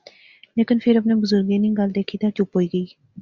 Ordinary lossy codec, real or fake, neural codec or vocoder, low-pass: Opus, 64 kbps; real; none; 7.2 kHz